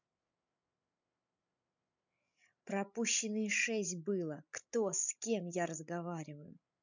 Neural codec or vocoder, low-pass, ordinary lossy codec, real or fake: codec, 16 kHz, 16 kbps, FreqCodec, larger model; 7.2 kHz; none; fake